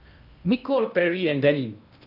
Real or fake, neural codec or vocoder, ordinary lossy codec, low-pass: fake; codec, 16 kHz in and 24 kHz out, 0.8 kbps, FocalCodec, streaming, 65536 codes; none; 5.4 kHz